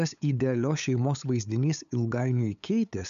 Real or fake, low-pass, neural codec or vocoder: fake; 7.2 kHz; codec, 16 kHz, 8 kbps, FunCodec, trained on LibriTTS, 25 frames a second